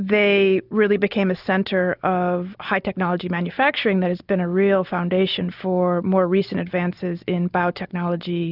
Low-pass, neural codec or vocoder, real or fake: 5.4 kHz; none; real